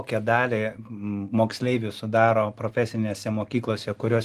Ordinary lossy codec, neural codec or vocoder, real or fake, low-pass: Opus, 24 kbps; none; real; 14.4 kHz